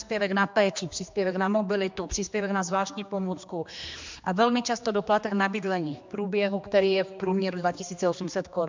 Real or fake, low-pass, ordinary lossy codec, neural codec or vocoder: fake; 7.2 kHz; MP3, 64 kbps; codec, 16 kHz, 2 kbps, X-Codec, HuBERT features, trained on general audio